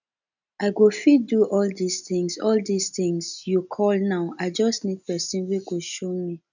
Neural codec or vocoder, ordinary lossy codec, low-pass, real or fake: none; none; 7.2 kHz; real